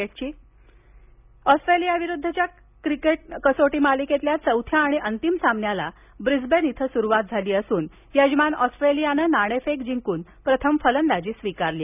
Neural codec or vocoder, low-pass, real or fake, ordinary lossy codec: none; 3.6 kHz; real; none